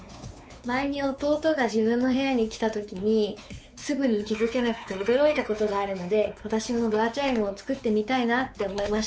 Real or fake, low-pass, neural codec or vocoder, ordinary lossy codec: fake; none; codec, 16 kHz, 4 kbps, X-Codec, WavLM features, trained on Multilingual LibriSpeech; none